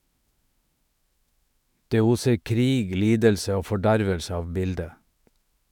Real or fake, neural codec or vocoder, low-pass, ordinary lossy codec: fake; autoencoder, 48 kHz, 128 numbers a frame, DAC-VAE, trained on Japanese speech; 19.8 kHz; none